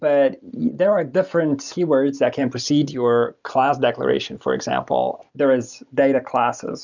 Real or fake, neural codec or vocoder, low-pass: real; none; 7.2 kHz